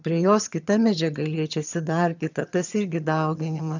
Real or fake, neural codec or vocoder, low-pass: fake; vocoder, 22.05 kHz, 80 mel bands, HiFi-GAN; 7.2 kHz